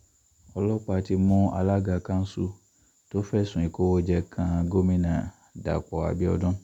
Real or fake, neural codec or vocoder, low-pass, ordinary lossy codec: real; none; 19.8 kHz; none